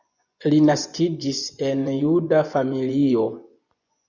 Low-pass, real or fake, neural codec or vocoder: 7.2 kHz; fake; vocoder, 24 kHz, 100 mel bands, Vocos